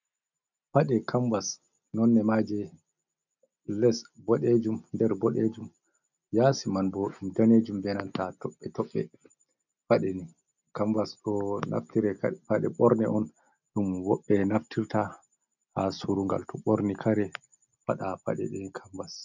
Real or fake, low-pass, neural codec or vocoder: real; 7.2 kHz; none